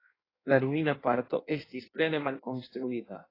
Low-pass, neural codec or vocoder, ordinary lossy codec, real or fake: 5.4 kHz; codec, 16 kHz in and 24 kHz out, 1.1 kbps, FireRedTTS-2 codec; AAC, 24 kbps; fake